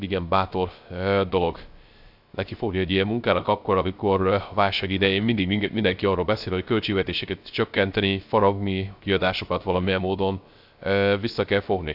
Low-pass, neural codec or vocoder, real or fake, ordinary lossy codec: 5.4 kHz; codec, 16 kHz, 0.3 kbps, FocalCodec; fake; AAC, 48 kbps